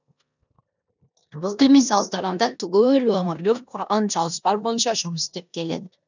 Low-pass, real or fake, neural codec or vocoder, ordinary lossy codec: 7.2 kHz; fake; codec, 16 kHz in and 24 kHz out, 0.9 kbps, LongCat-Audio-Codec, four codebook decoder; none